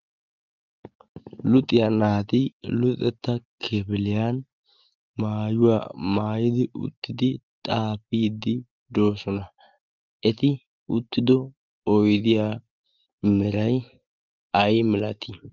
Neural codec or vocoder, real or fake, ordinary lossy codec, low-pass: none; real; Opus, 24 kbps; 7.2 kHz